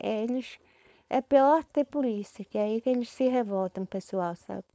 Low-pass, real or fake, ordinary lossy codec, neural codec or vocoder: none; fake; none; codec, 16 kHz, 4.8 kbps, FACodec